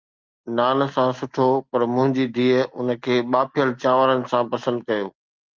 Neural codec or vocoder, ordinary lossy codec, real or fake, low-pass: none; Opus, 24 kbps; real; 7.2 kHz